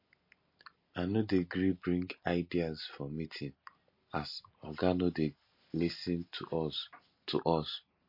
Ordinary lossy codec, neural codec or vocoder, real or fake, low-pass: MP3, 32 kbps; none; real; 5.4 kHz